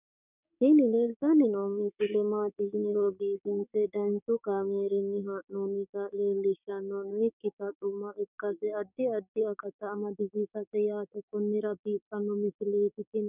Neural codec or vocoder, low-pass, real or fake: codec, 16 kHz, 6 kbps, DAC; 3.6 kHz; fake